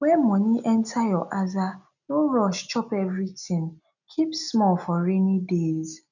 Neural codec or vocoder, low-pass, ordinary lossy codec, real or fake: none; 7.2 kHz; none; real